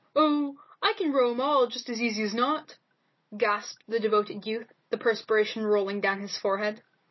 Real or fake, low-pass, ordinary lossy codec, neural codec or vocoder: real; 7.2 kHz; MP3, 24 kbps; none